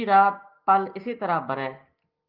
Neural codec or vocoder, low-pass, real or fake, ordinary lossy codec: none; 5.4 kHz; real; Opus, 24 kbps